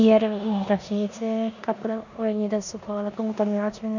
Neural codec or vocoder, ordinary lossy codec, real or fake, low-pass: codec, 16 kHz in and 24 kHz out, 0.9 kbps, LongCat-Audio-Codec, four codebook decoder; none; fake; 7.2 kHz